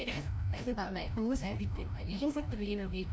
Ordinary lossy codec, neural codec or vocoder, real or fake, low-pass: none; codec, 16 kHz, 0.5 kbps, FreqCodec, larger model; fake; none